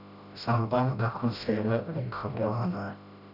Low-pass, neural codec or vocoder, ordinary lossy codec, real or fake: 5.4 kHz; codec, 16 kHz, 0.5 kbps, FreqCodec, smaller model; none; fake